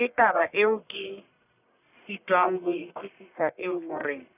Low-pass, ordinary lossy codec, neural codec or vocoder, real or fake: 3.6 kHz; none; codec, 44.1 kHz, 1.7 kbps, Pupu-Codec; fake